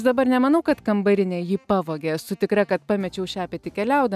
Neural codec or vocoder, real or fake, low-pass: none; real; 14.4 kHz